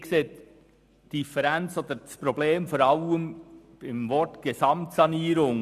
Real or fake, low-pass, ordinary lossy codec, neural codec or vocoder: real; 14.4 kHz; none; none